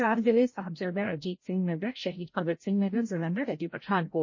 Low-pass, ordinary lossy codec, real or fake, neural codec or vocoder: 7.2 kHz; MP3, 32 kbps; fake; codec, 16 kHz, 0.5 kbps, FreqCodec, larger model